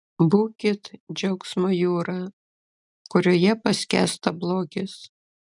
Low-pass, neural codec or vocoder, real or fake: 10.8 kHz; none; real